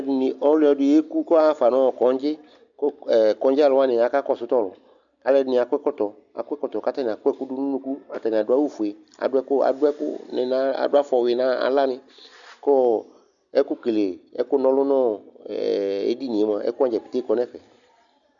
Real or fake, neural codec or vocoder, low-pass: real; none; 7.2 kHz